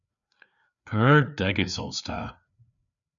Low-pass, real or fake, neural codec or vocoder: 7.2 kHz; fake; codec, 16 kHz, 4 kbps, FreqCodec, larger model